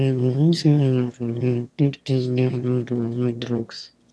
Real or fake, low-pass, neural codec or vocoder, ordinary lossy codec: fake; none; autoencoder, 22.05 kHz, a latent of 192 numbers a frame, VITS, trained on one speaker; none